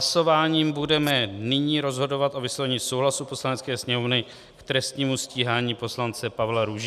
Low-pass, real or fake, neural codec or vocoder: 14.4 kHz; real; none